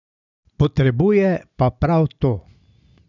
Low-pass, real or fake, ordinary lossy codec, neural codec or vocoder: 7.2 kHz; real; none; none